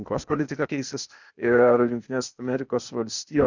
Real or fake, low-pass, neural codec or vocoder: fake; 7.2 kHz; codec, 16 kHz, 0.8 kbps, ZipCodec